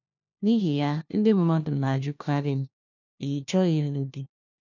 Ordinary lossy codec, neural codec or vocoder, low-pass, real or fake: none; codec, 16 kHz, 1 kbps, FunCodec, trained on LibriTTS, 50 frames a second; 7.2 kHz; fake